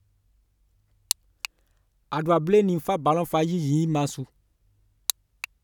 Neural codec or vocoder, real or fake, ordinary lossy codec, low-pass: none; real; none; 19.8 kHz